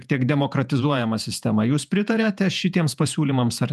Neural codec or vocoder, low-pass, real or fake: vocoder, 48 kHz, 128 mel bands, Vocos; 14.4 kHz; fake